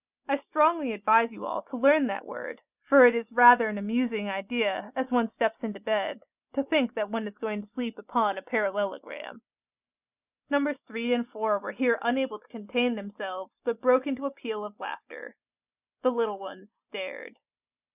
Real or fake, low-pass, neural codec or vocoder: real; 3.6 kHz; none